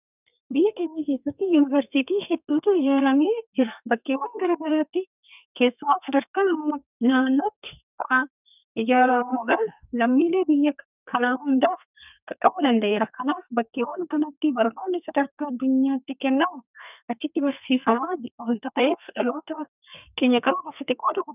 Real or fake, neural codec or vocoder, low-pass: fake; codec, 32 kHz, 1.9 kbps, SNAC; 3.6 kHz